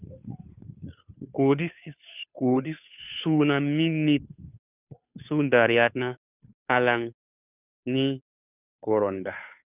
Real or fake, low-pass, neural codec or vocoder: fake; 3.6 kHz; codec, 16 kHz, 2 kbps, FunCodec, trained on Chinese and English, 25 frames a second